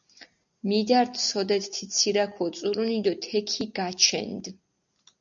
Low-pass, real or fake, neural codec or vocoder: 7.2 kHz; real; none